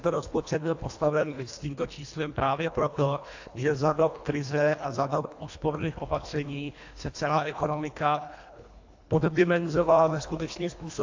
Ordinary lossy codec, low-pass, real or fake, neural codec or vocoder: AAC, 48 kbps; 7.2 kHz; fake; codec, 24 kHz, 1.5 kbps, HILCodec